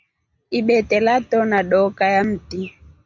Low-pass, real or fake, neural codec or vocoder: 7.2 kHz; real; none